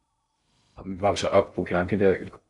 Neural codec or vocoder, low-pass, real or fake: codec, 16 kHz in and 24 kHz out, 0.6 kbps, FocalCodec, streaming, 2048 codes; 10.8 kHz; fake